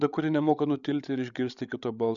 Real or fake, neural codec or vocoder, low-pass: fake; codec, 16 kHz, 16 kbps, FreqCodec, larger model; 7.2 kHz